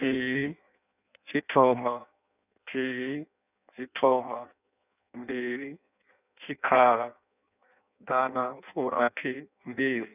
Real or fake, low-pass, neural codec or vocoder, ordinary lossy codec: fake; 3.6 kHz; codec, 16 kHz in and 24 kHz out, 0.6 kbps, FireRedTTS-2 codec; none